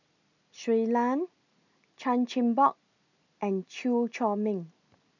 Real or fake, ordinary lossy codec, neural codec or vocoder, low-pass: real; none; none; 7.2 kHz